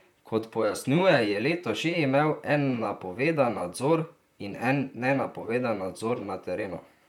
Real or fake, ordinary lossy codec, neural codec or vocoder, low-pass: fake; none; vocoder, 44.1 kHz, 128 mel bands, Pupu-Vocoder; 19.8 kHz